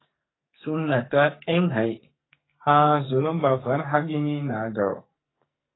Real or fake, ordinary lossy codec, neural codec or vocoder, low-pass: fake; AAC, 16 kbps; codec, 44.1 kHz, 2.6 kbps, SNAC; 7.2 kHz